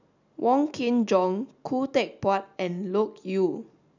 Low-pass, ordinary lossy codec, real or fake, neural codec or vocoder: 7.2 kHz; none; real; none